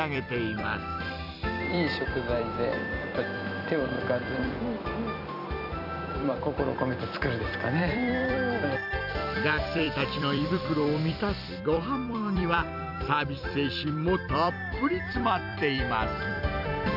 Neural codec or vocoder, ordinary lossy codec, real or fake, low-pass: vocoder, 44.1 kHz, 128 mel bands every 256 samples, BigVGAN v2; none; fake; 5.4 kHz